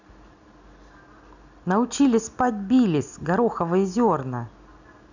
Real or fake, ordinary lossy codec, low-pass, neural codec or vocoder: real; none; 7.2 kHz; none